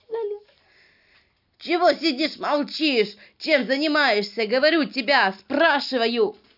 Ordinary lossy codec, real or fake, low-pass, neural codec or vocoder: none; real; 5.4 kHz; none